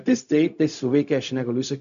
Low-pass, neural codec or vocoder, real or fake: 7.2 kHz; codec, 16 kHz, 0.4 kbps, LongCat-Audio-Codec; fake